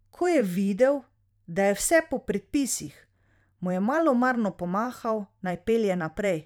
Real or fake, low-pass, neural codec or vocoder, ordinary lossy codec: fake; 19.8 kHz; vocoder, 44.1 kHz, 128 mel bands every 512 samples, BigVGAN v2; none